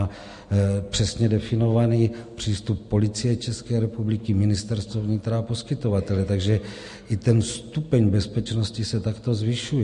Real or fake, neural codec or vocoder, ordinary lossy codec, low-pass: real; none; MP3, 48 kbps; 14.4 kHz